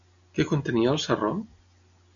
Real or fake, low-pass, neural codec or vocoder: real; 7.2 kHz; none